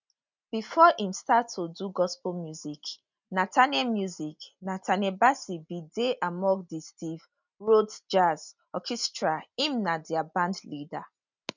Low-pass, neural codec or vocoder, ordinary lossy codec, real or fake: 7.2 kHz; none; none; real